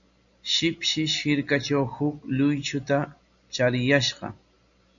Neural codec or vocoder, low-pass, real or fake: none; 7.2 kHz; real